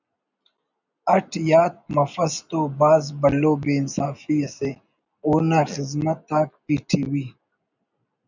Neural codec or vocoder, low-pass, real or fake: none; 7.2 kHz; real